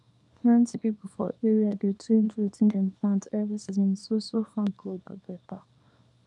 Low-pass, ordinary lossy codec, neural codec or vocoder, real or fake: 10.8 kHz; none; codec, 24 kHz, 0.9 kbps, WavTokenizer, small release; fake